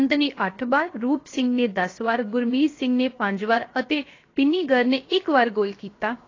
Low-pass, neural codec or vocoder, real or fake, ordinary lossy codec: 7.2 kHz; codec, 16 kHz, 0.7 kbps, FocalCodec; fake; AAC, 32 kbps